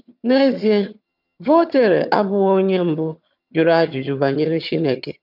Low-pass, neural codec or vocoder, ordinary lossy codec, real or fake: 5.4 kHz; vocoder, 22.05 kHz, 80 mel bands, HiFi-GAN; none; fake